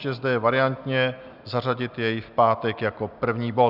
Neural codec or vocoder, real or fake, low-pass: none; real; 5.4 kHz